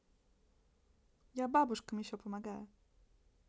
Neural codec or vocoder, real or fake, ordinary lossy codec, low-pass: none; real; none; none